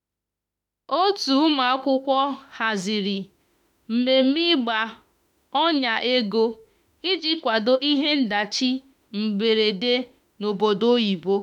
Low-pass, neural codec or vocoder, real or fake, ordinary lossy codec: 19.8 kHz; autoencoder, 48 kHz, 32 numbers a frame, DAC-VAE, trained on Japanese speech; fake; none